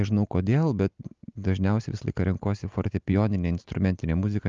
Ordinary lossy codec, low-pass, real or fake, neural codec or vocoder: Opus, 32 kbps; 7.2 kHz; real; none